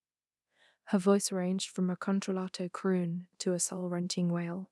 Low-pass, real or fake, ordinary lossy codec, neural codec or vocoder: none; fake; none; codec, 24 kHz, 0.9 kbps, DualCodec